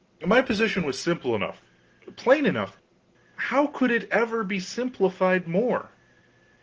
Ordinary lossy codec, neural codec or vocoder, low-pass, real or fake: Opus, 16 kbps; none; 7.2 kHz; real